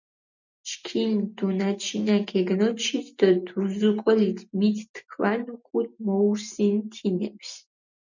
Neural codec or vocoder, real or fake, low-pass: none; real; 7.2 kHz